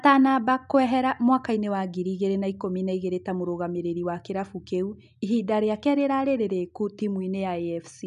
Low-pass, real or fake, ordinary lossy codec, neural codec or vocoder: 10.8 kHz; real; none; none